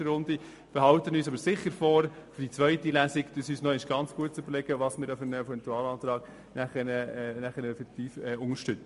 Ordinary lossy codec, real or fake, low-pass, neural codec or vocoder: MP3, 48 kbps; real; 14.4 kHz; none